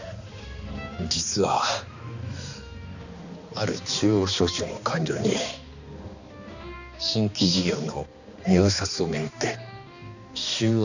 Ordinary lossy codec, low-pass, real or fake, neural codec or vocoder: none; 7.2 kHz; fake; codec, 16 kHz, 2 kbps, X-Codec, HuBERT features, trained on balanced general audio